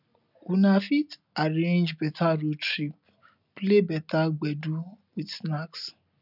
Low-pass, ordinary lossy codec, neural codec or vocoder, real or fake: 5.4 kHz; none; none; real